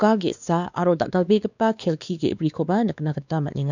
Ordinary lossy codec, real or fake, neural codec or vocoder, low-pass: none; fake; codec, 16 kHz, 2 kbps, X-Codec, WavLM features, trained on Multilingual LibriSpeech; 7.2 kHz